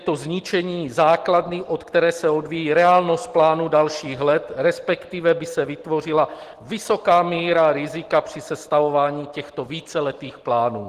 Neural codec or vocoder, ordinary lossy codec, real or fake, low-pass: none; Opus, 16 kbps; real; 14.4 kHz